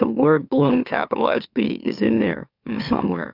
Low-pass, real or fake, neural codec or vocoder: 5.4 kHz; fake; autoencoder, 44.1 kHz, a latent of 192 numbers a frame, MeloTTS